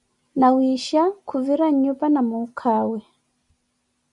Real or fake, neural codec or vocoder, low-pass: real; none; 10.8 kHz